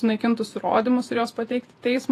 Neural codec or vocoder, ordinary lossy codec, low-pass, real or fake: vocoder, 48 kHz, 128 mel bands, Vocos; AAC, 48 kbps; 14.4 kHz; fake